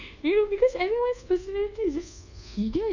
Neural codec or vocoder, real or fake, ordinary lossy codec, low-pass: codec, 24 kHz, 1.2 kbps, DualCodec; fake; none; 7.2 kHz